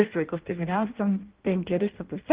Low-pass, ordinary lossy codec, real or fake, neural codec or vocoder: 3.6 kHz; Opus, 16 kbps; fake; codec, 16 kHz in and 24 kHz out, 0.6 kbps, FireRedTTS-2 codec